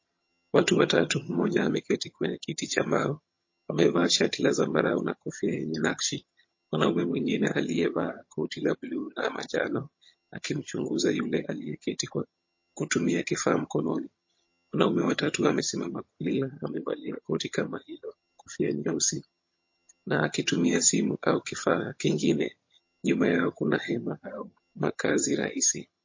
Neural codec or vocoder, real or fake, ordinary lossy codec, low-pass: vocoder, 22.05 kHz, 80 mel bands, HiFi-GAN; fake; MP3, 32 kbps; 7.2 kHz